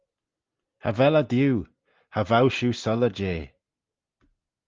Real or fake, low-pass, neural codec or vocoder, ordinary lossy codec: real; 7.2 kHz; none; Opus, 32 kbps